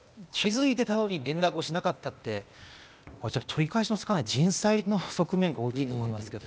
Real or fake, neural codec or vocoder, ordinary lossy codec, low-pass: fake; codec, 16 kHz, 0.8 kbps, ZipCodec; none; none